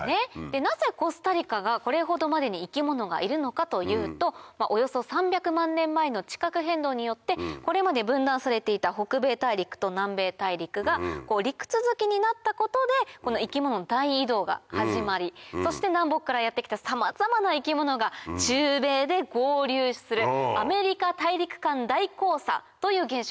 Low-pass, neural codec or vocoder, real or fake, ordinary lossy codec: none; none; real; none